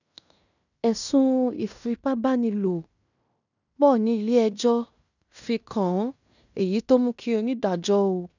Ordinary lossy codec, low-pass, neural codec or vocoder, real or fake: none; 7.2 kHz; codec, 16 kHz in and 24 kHz out, 0.9 kbps, LongCat-Audio-Codec, fine tuned four codebook decoder; fake